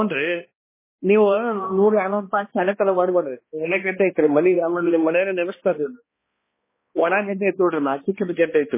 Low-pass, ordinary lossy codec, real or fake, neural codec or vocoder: 3.6 kHz; MP3, 16 kbps; fake; codec, 16 kHz, 1 kbps, X-Codec, HuBERT features, trained on balanced general audio